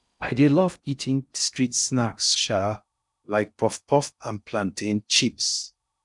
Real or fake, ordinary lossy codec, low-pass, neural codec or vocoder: fake; none; 10.8 kHz; codec, 16 kHz in and 24 kHz out, 0.6 kbps, FocalCodec, streaming, 4096 codes